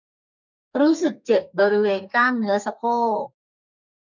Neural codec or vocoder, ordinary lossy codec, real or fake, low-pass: codec, 32 kHz, 1.9 kbps, SNAC; none; fake; 7.2 kHz